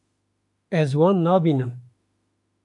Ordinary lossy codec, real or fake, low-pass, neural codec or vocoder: AAC, 64 kbps; fake; 10.8 kHz; autoencoder, 48 kHz, 32 numbers a frame, DAC-VAE, trained on Japanese speech